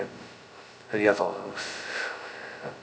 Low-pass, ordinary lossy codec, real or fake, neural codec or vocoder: none; none; fake; codec, 16 kHz, 0.2 kbps, FocalCodec